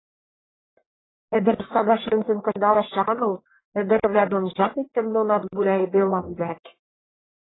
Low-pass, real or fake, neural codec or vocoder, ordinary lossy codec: 7.2 kHz; fake; codec, 44.1 kHz, 1.7 kbps, Pupu-Codec; AAC, 16 kbps